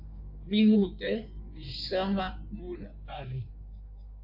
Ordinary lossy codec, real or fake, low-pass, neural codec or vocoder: AAC, 48 kbps; fake; 5.4 kHz; codec, 16 kHz in and 24 kHz out, 1.1 kbps, FireRedTTS-2 codec